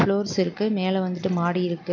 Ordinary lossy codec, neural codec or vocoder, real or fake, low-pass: none; none; real; 7.2 kHz